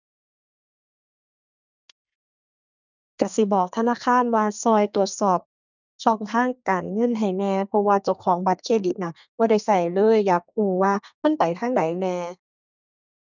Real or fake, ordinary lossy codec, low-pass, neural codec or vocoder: fake; none; 7.2 kHz; codec, 32 kHz, 1.9 kbps, SNAC